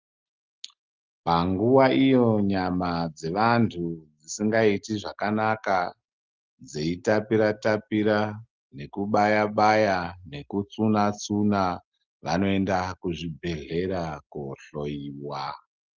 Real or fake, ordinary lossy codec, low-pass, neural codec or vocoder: real; Opus, 32 kbps; 7.2 kHz; none